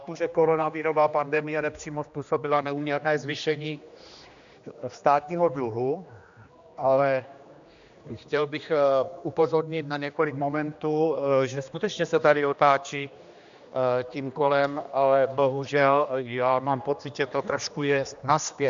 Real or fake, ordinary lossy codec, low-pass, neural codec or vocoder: fake; MP3, 64 kbps; 7.2 kHz; codec, 16 kHz, 2 kbps, X-Codec, HuBERT features, trained on general audio